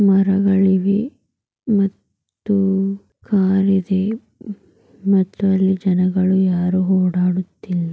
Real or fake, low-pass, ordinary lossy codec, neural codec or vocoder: real; none; none; none